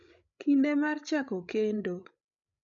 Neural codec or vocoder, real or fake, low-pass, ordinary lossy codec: codec, 16 kHz, 16 kbps, FreqCodec, larger model; fake; 7.2 kHz; none